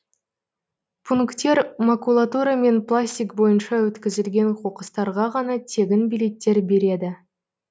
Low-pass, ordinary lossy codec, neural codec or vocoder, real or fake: none; none; none; real